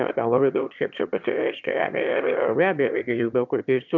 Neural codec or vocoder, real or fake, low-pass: autoencoder, 22.05 kHz, a latent of 192 numbers a frame, VITS, trained on one speaker; fake; 7.2 kHz